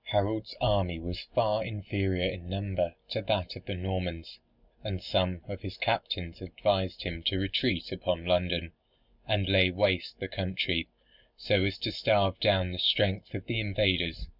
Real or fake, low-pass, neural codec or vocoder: real; 5.4 kHz; none